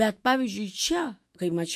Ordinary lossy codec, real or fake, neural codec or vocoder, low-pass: MP3, 64 kbps; fake; autoencoder, 48 kHz, 128 numbers a frame, DAC-VAE, trained on Japanese speech; 14.4 kHz